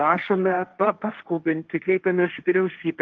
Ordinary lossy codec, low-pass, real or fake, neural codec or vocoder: Opus, 32 kbps; 7.2 kHz; fake; codec, 16 kHz, 1.1 kbps, Voila-Tokenizer